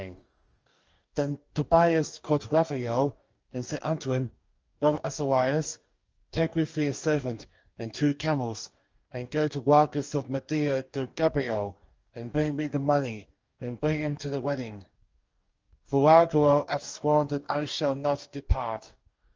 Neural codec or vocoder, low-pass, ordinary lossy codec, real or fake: codec, 44.1 kHz, 2.6 kbps, DAC; 7.2 kHz; Opus, 24 kbps; fake